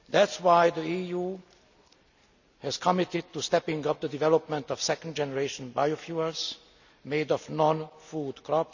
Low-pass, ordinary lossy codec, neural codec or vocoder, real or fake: 7.2 kHz; none; none; real